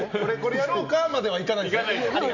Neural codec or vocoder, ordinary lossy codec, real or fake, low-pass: none; none; real; 7.2 kHz